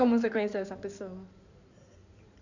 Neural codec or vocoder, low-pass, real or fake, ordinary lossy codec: codec, 16 kHz in and 24 kHz out, 2.2 kbps, FireRedTTS-2 codec; 7.2 kHz; fake; none